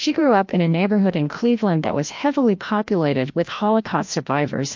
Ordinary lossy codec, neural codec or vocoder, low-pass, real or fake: MP3, 48 kbps; codec, 16 kHz, 1 kbps, FreqCodec, larger model; 7.2 kHz; fake